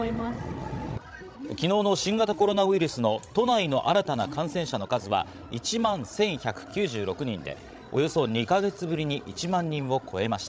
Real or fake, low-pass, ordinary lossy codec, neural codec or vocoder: fake; none; none; codec, 16 kHz, 16 kbps, FreqCodec, larger model